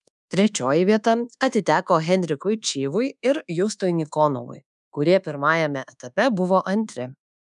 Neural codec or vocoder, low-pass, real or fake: codec, 24 kHz, 1.2 kbps, DualCodec; 10.8 kHz; fake